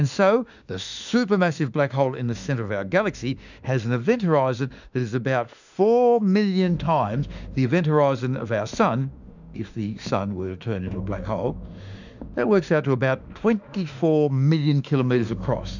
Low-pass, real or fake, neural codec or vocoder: 7.2 kHz; fake; autoencoder, 48 kHz, 32 numbers a frame, DAC-VAE, trained on Japanese speech